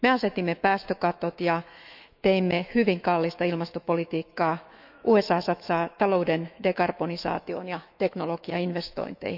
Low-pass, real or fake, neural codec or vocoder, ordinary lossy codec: 5.4 kHz; fake; autoencoder, 48 kHz, 128 numbers a frame, DAC-VAE, trained on Japanese speech; none